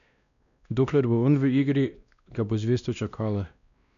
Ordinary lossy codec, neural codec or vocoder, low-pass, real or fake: none; codec, 16 kHz, 1 kbps, X-Codec, WavLM features, trained on Multilingual LibriSpeech; 7.2 kHz; fake